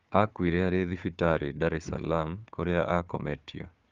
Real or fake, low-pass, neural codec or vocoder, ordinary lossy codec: fake; 7.2 kHz; codec, 16 kHz, 4 kbps, FunCodec, trained on Chinese and English, 50 frames a second; Opus, 32 kbps